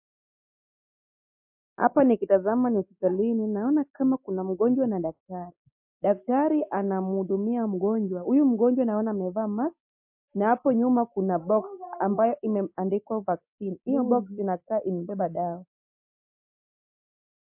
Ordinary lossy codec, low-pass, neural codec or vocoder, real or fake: MP3, 32 kbps; 3.6 kHz; none; real